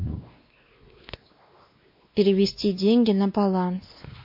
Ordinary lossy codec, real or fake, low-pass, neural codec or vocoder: MP3, 32 kbps; fake; 5.4 kHz; codec, 16 kHz, 2 kbps, X-Codec, WavLM features, trained on Multilingual LibriSpeech